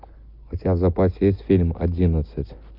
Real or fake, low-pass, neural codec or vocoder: real; 5.4 kHz; none